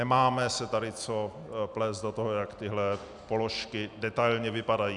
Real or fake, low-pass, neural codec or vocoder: real; 10.8 kHz; none